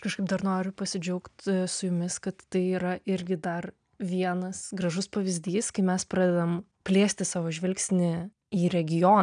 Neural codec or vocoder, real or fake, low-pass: none; real; 9.9 kHz